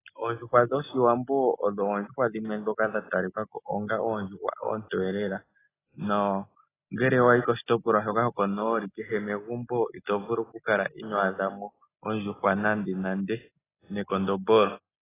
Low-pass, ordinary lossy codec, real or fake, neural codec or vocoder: 3.6 kHz; AAC, 16 kbps; real; none